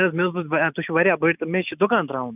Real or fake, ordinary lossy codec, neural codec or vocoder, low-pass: real; none; none; 3.6 kHz